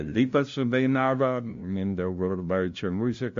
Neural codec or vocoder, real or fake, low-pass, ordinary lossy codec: codec, 16 kHz, 0.5 kbps, FunCodec, trained on LibriTTS, 25 frames a second; fake; 7.2 kHz; MP3, 48 kbps